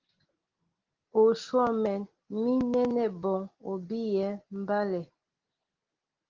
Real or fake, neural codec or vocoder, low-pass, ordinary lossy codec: real; none; 7.2 kHz; Opus, 16 kbps